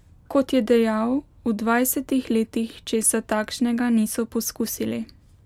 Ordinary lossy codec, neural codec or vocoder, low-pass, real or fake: MP3, 96 kbps; none; 19.8 kHz; real